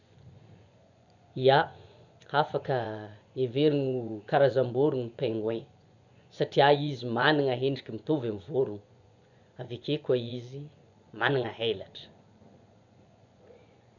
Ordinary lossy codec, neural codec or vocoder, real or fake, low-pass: none; vocoder, 24 kHz, 100 mel bands, Vocos; fake; 7.2 kHz